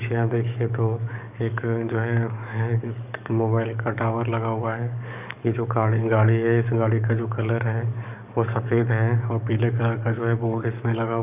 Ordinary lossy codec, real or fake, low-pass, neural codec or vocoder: none; fake; 3.6 kHz; codec, 44.1 kHz, 7.8 kbps, DAC